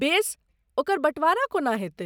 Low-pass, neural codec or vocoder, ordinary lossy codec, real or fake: none; none; none; real